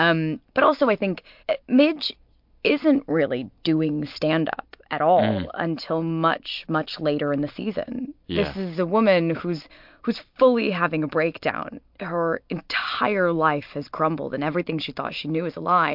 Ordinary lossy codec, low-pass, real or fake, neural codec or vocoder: MP3, 48 kbps; 5.4 kHz; real; none